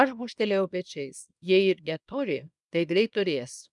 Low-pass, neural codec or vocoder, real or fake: 10.8 kHz; codec, 24 kHz, 0.9 kbps, WavTokenizer, small release; fake